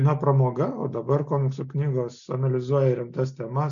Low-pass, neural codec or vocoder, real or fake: 7.2 kHz; none; real